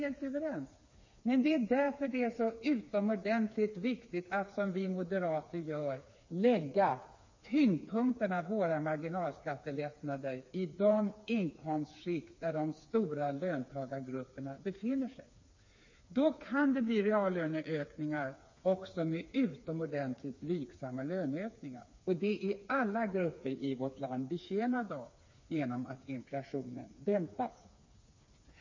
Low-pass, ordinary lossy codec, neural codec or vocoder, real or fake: 7.2 kHz; MP3, 32 kbps; codec, 16 kHz, 4 kbps, FreqCodec, smaller model; fake